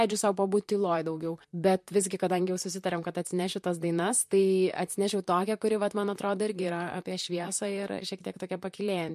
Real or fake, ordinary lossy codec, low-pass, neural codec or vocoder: fake; MP3, 64 kbps; 14.4 kHz; vocoder, 44.1 kHz, 128 mel bands, Pupu-Vocoder